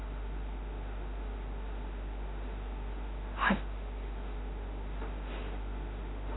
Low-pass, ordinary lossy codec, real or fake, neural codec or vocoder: 7.2 kHz; AAC, 16 kbps; fake; autoencoder, 48 kHz, 32 numbers a frame, DAC-VAE, trained on Japanese speech